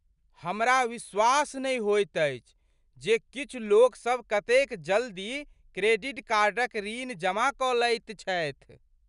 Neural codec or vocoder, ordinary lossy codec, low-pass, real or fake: none; none; 10.8 kHz; real